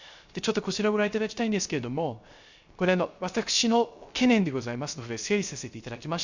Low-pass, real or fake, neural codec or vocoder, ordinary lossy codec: 7.2 kHz; fake; codec, 16 kHz, 0.3 kbps, FocalCodec; Opus, 64 kbps